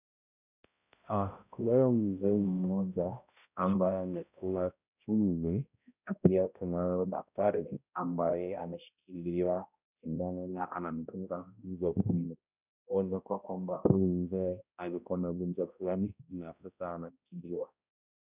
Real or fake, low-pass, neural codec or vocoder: fake; 3.6 kHz; codec, 16 kHz, 0.5 kbps, X-Codec, HuBERT features, trained on balanced general audio